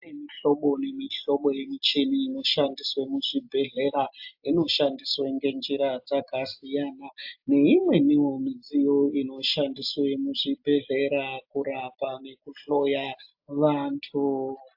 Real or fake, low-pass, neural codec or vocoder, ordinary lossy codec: real; 5.4 kHz; none; AAC, 48 kbps